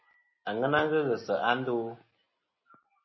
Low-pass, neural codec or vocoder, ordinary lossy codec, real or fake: 7.2 kHz; none; MP3, 24 kbps; real